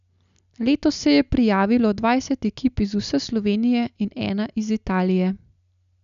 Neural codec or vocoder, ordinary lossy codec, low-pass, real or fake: none; none; 7.2 kHz; real